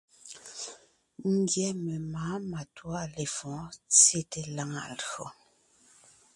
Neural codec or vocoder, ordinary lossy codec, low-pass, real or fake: none; MP3, 96 kbps; 10.8 kHz; real